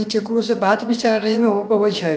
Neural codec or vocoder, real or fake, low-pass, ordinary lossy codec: codec, 16 kHz, about 1 kbps, DyCAST, with the encoder's durations; fake; none; none